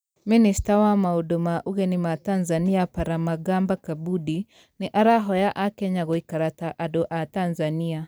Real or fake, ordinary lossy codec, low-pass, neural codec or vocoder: fake; none; none; vocoder, 44.1 kHz, 128 mel bands every 256 samples, BigVGAN v2